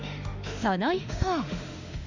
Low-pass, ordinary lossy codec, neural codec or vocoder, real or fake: 7.2 kHz; none; autoencoder, 48 kHz, 32 numbers a frame, DAC-VAE, trained on Japanese speech; fake